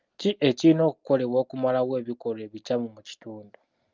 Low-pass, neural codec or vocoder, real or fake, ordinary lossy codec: 7.2 kHz; none; real; Opus, 24 kbps